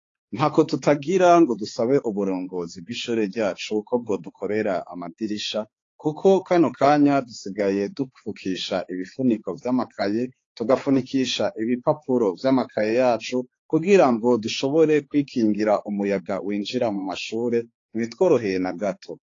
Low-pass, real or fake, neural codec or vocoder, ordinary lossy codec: 7.2 kHz; fake; codec, 16 kHz, 4 kbps, X-Codec, HuBERT features, trained on balanced general audio; AAC, 32 kbps